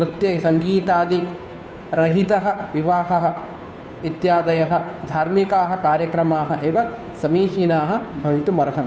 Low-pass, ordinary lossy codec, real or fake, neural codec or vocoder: none; none; fake; codec, 16 kHz, 2 kbps, FunCodec, trained on Chinese and English, 25 frames a second